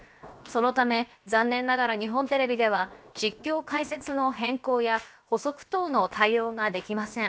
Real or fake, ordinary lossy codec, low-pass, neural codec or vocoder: fake; none; none; codec, 16 kHz, 0.7 kbps, FocalCodec